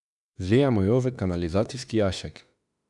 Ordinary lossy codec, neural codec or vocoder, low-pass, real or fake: none; autoencoder, 48 kHz, 32 numbers a frame, DAC-VAE, trained on Japanese speech; 10.8 kHz; fake